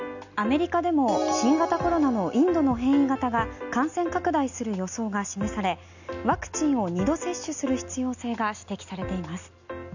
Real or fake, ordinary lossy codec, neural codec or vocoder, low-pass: real; none; none; 7.2 kHz